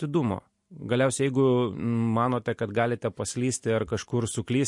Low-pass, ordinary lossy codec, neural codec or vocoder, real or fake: 10.8 kHz; MP3, 48 kbps; none; real